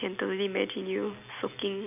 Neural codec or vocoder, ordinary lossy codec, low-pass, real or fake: none; none; 3.6 kHz; real